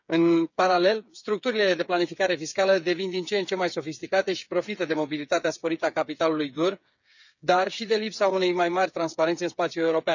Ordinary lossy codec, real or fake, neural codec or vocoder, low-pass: none; fake; codec, 16 kHz, 8 kbps, FreqCodec, smaller model; 7.2 kHz